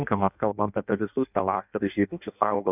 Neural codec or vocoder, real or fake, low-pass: codec, 16 kHz in and 24 kHz out, 0.6 kbps, FireRedTTS-2 codec; fake; 3.6 kHz